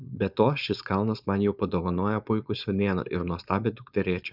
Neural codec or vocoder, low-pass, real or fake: codec, 16 kHz, 4.8 kbps, FACodec; 5.4 kHz; fake